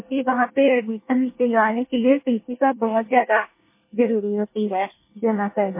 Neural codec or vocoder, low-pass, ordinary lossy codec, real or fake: codec, 24 kHz, 1 kbps, SNAC; 3.6 kHz; MP3, 24 kbps; fake